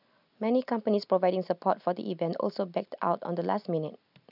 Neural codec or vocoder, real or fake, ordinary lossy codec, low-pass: none; real; none; 5.4 kHz